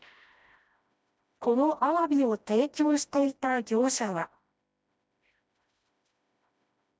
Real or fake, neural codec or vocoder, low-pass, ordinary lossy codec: fake; codec, 16 kHz, 1 kbps, FreqCodec, smaller model; none; none